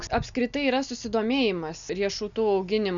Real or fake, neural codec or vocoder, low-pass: real; none; 7.2 kHz